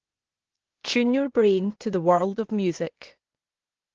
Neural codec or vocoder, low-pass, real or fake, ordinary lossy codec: codec, 16 kHz, 0.8 kbps, ZipCodec; 7.2 kHz; fake; Opus, 16 kbps